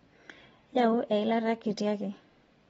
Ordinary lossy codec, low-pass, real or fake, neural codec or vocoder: AAC, 24 kbps; 19.8 kHz; real; none